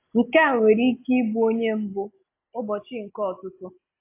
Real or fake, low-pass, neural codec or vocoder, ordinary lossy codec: real; 3.6 kHz; none; AAC, 24 kbps